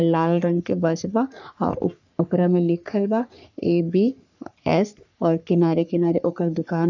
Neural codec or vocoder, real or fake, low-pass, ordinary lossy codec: codec, 44.1 kHz, 3.4 kbps, Pupu-Codec; fake; 7.2 kHz; none